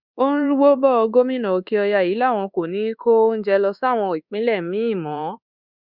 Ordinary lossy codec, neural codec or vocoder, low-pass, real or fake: Opus, 64 kbps; codec, 24 kHz, 1.2 kbps, DualCodec; 5.4 kHz; fake